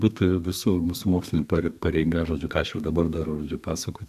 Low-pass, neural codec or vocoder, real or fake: 14.4 kHz; codec, 32 kHz, 1.9 kbps, SNAC; fake